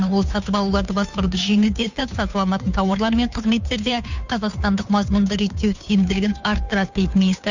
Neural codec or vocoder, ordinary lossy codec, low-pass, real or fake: codec, 16 kHz, 2 kbps, FunCodec, trained on Chinese and English, 25 frames a second; none; 7.2 kHz; fake